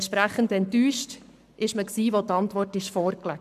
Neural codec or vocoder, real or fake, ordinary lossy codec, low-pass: codec, 44.1 kHz, 7.8 kbps, Pupu-Codec; fake; none; 14.4 kHz